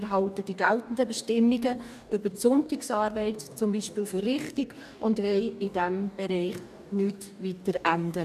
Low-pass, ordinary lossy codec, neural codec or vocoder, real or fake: 14.4 kHz; none; codec, 44.1 kHz, 2.6 kbps, DAC; fake